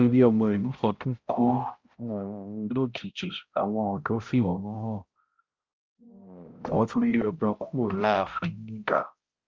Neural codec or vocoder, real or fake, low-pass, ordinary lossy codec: codec, 16 kHz, 0.5 kbps, X-Codec, HuBERT features, trained on balanced general audio; fake; 7.2 kHz; Opus, 24 kbps